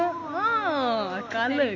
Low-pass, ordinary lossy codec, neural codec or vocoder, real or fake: 7.2 kHz; none; none; real